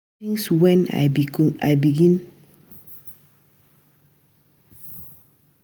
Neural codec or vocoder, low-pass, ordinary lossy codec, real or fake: none; none; none; real